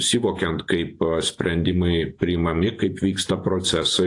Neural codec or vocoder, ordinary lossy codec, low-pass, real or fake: vocoder, 48 kHz, 128 mel bands, Vocos; AAC, 48 kbps; 10.8 kHz; fake